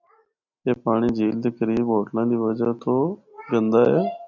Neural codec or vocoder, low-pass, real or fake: none; 7.2 kHz; real